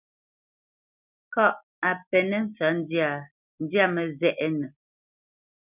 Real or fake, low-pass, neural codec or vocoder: real; 3.6 kHz; none